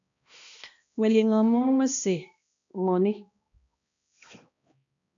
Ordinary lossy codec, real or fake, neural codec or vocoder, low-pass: AAC, 64 kbps; fake; codec, 16 kHz, 1 kbps, X-Codec, HuBERT features, trained on balanced general audio; 7.2 kHz